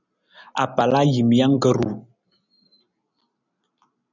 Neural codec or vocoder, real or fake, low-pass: none; real; 7.2 kHz